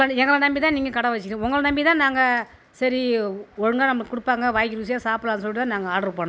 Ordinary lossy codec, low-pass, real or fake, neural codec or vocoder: none; none; real; none